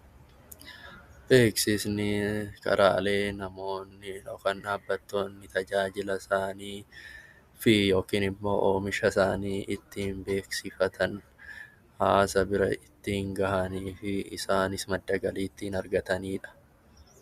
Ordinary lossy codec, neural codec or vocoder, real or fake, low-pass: Opus, 64 kbps; none; real; 14.4 kHz